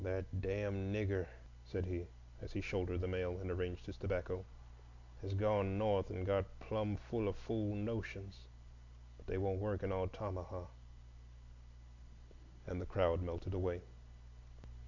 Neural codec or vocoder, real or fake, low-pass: none; real; 7.2 kHz